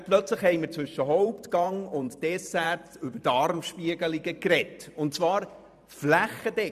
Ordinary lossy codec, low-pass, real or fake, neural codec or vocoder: none; 14.4 kHz; fake; vocoder, 44.1 kHz, 128 mel bands every 512 samples, BigVGAN v2